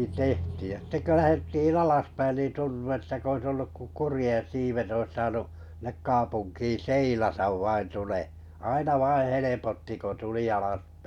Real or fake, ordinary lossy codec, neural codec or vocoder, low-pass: real; none; none; 19.8 kHz